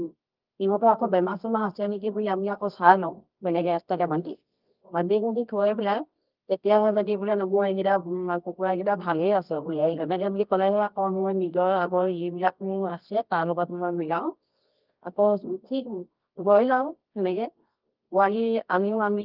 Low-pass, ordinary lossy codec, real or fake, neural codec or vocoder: 5.4 kHz; Opus, 32 kbps; fake; codec, 24 kHz, 0.9 kbps, WavTokenizer, medium music audio release